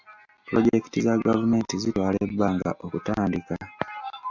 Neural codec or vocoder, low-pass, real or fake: none; 7.2 kHz; real